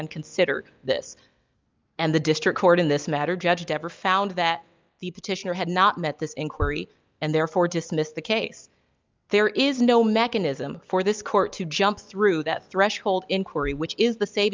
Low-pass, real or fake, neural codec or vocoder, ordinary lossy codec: 7.2 kHz; real; none; Opus, 24 kbps